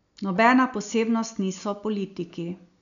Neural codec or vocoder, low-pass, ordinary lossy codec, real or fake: none; 7.2 kHz; none; real